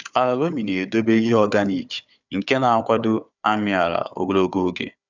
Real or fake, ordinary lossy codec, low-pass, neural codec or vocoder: fake; none; 7.2 kHz; codec, 16 kHz, 4 kbps, FunCodec, trained on Chinese and English, 50 frames a second